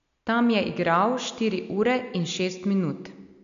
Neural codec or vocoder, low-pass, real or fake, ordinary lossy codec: none; 7.2 kHz; real; none